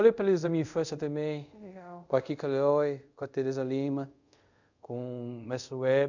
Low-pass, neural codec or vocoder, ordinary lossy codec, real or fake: 7.2 kHz; codec, 24 kHz, 0.5 kbps, DualCodec; none; fake